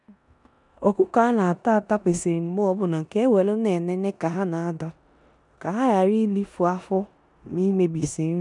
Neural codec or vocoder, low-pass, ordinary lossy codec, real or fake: codec, 16 kHz in and 24 kHz out, 0.9 kbps, LongCat-Audio-Codec, four codebook decoder; 10.8 kHz; none; fake